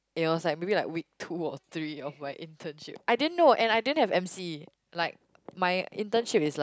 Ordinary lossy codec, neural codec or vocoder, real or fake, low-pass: none; none; real; none